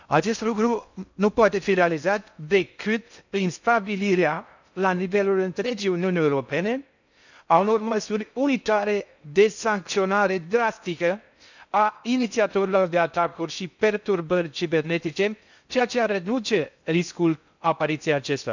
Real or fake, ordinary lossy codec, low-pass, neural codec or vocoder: fake; none; 7.2 kHz; codec, 16 kHz in and 24 kHz out, 0.6 kbps, FocalCodec, streaming, 2048 codes